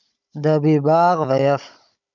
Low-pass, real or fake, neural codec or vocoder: 7.2 kHz; fake; codec, 16 kHz, 16 kbps, FunCodec, trained on Chinese and English, 50 frames a second